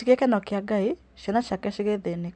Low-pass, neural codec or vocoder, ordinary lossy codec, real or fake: 9.9 kHz; none; none; real